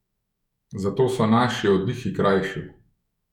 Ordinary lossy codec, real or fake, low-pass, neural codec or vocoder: none; fake; 19.8 kHz; autoencoder, 48 kHz, 128 numbers a frame, DAC-VAE, trained on Japanese speech